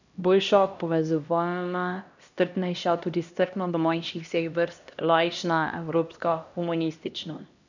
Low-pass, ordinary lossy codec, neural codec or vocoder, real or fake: 7.2 kHz; none; codec, 16 kHz, 1 kbps, X-Codec, HuBERT features, trained on LibriSpeech; fake